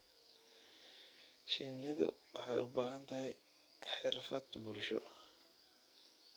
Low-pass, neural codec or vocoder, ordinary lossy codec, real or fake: none; codec, 44.1 kHz, 2.6 kbps, SNAC; none; fake